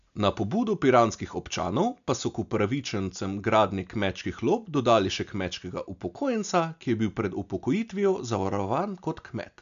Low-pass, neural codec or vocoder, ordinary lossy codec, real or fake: 7.2 kHz; none; none; real